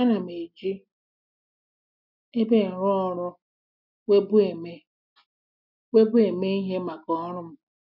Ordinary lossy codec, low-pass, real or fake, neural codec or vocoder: none; 5.4 kHz; real; none